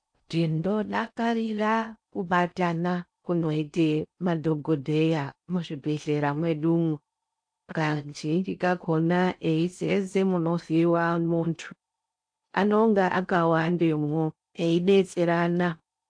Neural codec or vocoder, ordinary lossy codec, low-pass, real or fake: codec, 16 kHz in and 24 kHz out, 0.6 kbps, FocalCodec, streaming, 4096 codes; AAC, 64 kbps; 9.9 kHz; fake